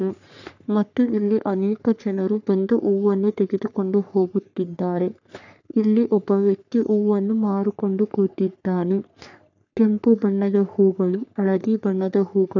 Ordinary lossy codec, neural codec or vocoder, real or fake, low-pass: none; codec, 44.1 kHz, 3.4 kbps, Pupu-Codec; fake; 7.2 kHz